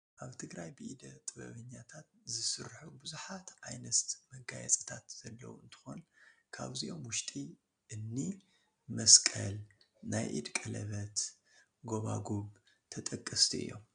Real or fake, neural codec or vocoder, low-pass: real; none; 9.9 kHz